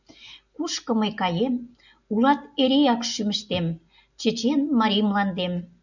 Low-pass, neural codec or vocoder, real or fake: 7.2 kHz; none; real